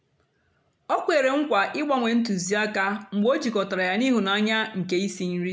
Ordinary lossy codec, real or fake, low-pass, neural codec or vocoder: none; real; none; none